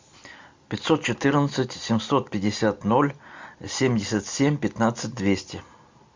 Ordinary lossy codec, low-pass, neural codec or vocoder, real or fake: MP3, 64 kbps; 7.2 kHz; none; real